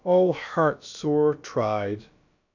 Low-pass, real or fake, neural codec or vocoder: 7.2 kHz; fake; codec, 16 kHz, about 1 kbps, DyCAST, with the encoder's durations